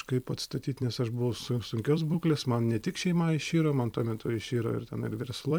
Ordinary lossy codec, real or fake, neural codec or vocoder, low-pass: MP3, 96 kbps; fake; vocoder, 44.1 kHz, 128 mel bands every 256 samples, BigVGAN v2; 19.8 kHz